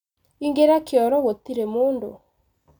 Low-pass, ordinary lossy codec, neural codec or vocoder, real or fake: 19.8 kHz; none; none; real